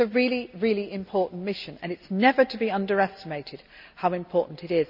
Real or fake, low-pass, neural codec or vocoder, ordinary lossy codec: real; 5.4 kHz; none; none